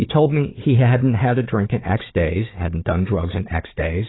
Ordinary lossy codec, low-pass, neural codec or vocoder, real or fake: AAC, 16 kbps; 7.2 kHz; codec, 16 kHz, 4 kbps, FunCodec, trained on Chinese and English, 50 frames a second; fake